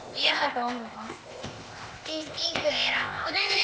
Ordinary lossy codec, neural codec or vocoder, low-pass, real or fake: none; codec, 16 kHz, 0.8 kbps, ZipCodec; none; fake